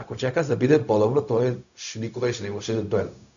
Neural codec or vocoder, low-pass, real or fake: codec, 16 kHz, 0.4 kbps, LongCat-Audio-Codec; 7.2 kHz; fake